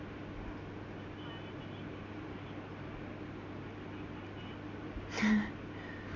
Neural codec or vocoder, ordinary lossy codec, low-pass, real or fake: none; none; 7.2 kHz; real